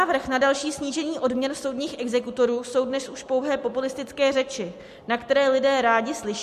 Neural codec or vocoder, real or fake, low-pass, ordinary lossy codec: none; real; 14.4 kHz; MP3, 64 kbps